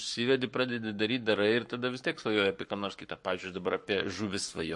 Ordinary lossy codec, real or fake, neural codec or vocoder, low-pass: MP3, 48 kbps; fake; codec, 44.1 kHz, 7.8 kbps, Pupu-Codec; 10.8 kHz